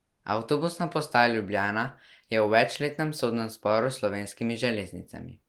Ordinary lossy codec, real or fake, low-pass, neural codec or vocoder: Opus, 24 kbps; real; 19.8 kHz; none